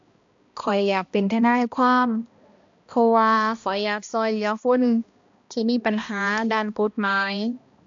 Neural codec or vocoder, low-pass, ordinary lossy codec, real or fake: codec, 16 kHz, 1 kbps, X-Codec, HuBERT features, trained on balanced general audio; 7.2 kHz; AAC, 64 kbps; fake